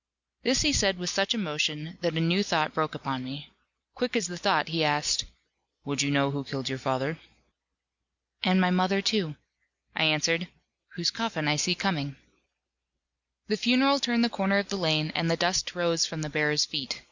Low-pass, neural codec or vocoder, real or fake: 7.2 kHz; none; real